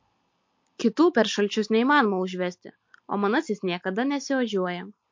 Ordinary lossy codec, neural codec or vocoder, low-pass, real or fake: MP3, 48 kbps; none; 7.2 kHz; real